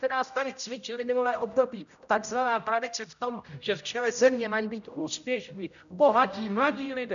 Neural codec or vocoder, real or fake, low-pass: codec, 16 kHz, 0.5 kbps, X-Codec, HuBERT features, trained on general audio; fake; 7.2 kHz